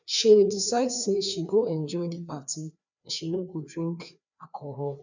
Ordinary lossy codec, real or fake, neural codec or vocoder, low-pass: none; fake; codec, 16 kHz, 2 kbps, FreqCodec, larger model; 7.2 kHz